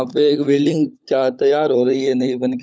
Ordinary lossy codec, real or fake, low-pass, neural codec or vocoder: none; fake; none; codec, 16 kHz, 16 kbps, FunCodec, trained on LibriTTS, 50 frames a second